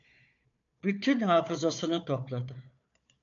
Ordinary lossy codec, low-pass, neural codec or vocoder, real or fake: MP3, 64 kbps; 7.2 kHz; codec, 16 kHz, 4 kbps, FunCodec, trained on Chinese and English, 50 frames a second; fake